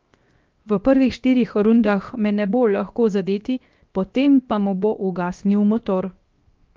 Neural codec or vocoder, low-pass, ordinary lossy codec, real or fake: codec, 16 kHz, 0.7 kbps, FocalCodec; 7.2 kHz; Opus, 24 kbps; fake